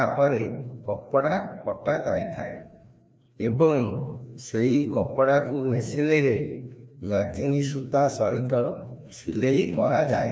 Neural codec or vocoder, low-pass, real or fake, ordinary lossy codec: codec, 16 kHz, 1 kbps, FreqCodec, larger model; none; fake; none